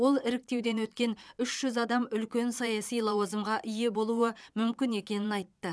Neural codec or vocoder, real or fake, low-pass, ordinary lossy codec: vocoder, 22.05 kHz, 80 mel bands, Vocos; fake; none; none